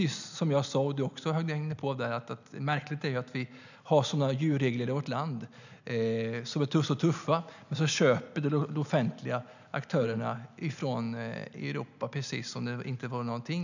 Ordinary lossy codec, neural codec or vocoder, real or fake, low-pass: none; none; real; 7.2 kHz